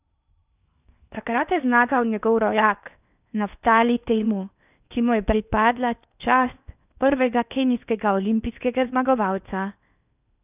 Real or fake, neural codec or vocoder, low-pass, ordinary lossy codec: fake; codec, 16 kHz in and 24 kHz out, 0.8 kbps, FocalCodec, streaming, 65536 codes; 3.6 kHz; none